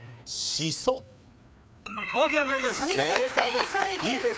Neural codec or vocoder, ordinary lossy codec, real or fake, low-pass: codec, 16 kHz, 2 kbps, FreqCodec, larger model; none; fake; none